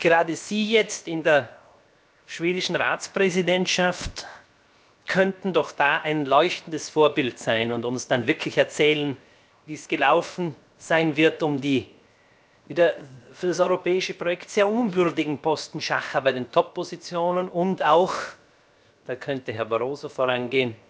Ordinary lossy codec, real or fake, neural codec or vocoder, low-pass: none; fake; codec, 16 kHz, 0.7 kbps, FocalCodec; none